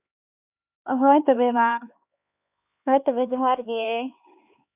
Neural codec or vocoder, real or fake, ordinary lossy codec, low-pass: codec, 16 kHz, 4 kbps, X-Codec, HuBERT features, trained on LibriSpeech; fake; none; 3.6 kHz